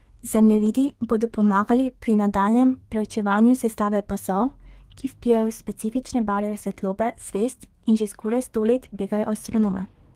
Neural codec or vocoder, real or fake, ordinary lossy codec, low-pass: codec, 32 kHz, 1.9 kbps, SNAC; fake; Opus, 24 kbps; 14.4 kHz